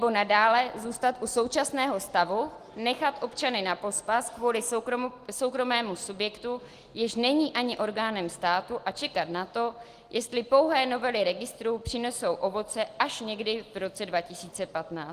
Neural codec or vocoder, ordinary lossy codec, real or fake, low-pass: none; Opus, 24 kbps; real; 14.4 kHz